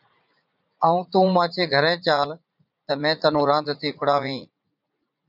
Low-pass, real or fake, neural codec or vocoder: 5.4 kHz; fake; vocoder, 44.1 kHz, 80 mel bands, Vocos